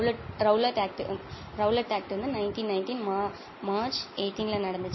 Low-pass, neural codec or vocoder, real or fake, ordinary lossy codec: 7.2 kHz; none; real; MP3, 24 kbps